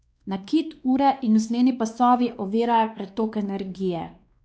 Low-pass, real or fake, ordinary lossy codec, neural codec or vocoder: none; fake; none; codec, 16 kHz, 2 kbps, X-Codec, WavLM features, trained on Multilingual LibriSpeech